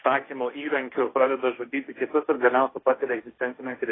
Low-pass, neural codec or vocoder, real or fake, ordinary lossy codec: 7.2 kHz; codec, 16 kHz, 1.1 kbps, Voila-Tokenizer; fake; AAC, 16 kbps